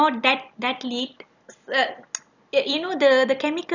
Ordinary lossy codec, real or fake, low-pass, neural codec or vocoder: none; real; 7.2 kHz; none